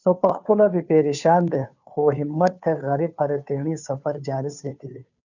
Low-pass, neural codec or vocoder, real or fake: 7.2 kHz; codec, 16 kHz, 2 kbps, FunCodec, trained on Chinese and English, 25 frames a second; fake